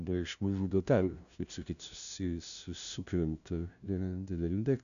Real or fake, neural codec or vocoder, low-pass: fake; codec, 16 kHz, 0.5 kbps, FunCodec, trained on LibriTTS, 25 frames a second; 7.2 kHz